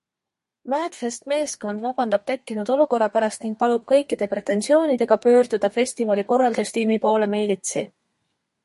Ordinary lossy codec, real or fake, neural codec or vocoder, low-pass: MP3, 48 kbps; fake; codec, 32 kHz, 1.9 kbps, SNAC; 14.4 kHz